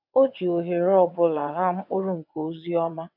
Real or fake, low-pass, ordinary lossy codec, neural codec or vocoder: fake; 5.4 kHz; none; vocoder, 22.05 kHz, 80 mel bands, WaveNeXt